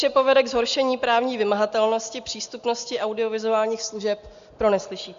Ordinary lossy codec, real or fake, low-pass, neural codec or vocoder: Opus, 64 kbps; real; 7.2 kHz; none